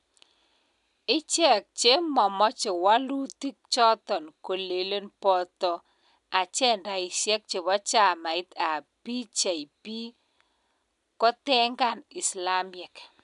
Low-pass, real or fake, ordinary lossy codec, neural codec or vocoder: 10.8 kHz; real; none; none